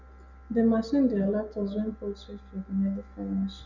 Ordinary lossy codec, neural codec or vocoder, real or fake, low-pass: none; none; real; 7.2 kHz